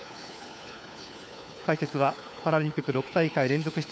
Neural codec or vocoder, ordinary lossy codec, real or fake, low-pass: codec, 16 kHz, 4 kbps, FunCodec, trained on LibriTTS, 50 frames a second; none; fake; none